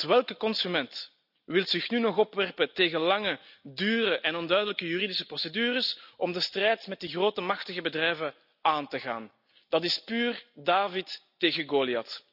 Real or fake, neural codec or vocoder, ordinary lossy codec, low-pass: real; none; none; 5.4 kHz